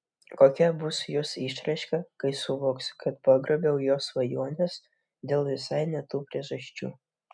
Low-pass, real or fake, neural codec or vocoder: 9.9 kHz; real; none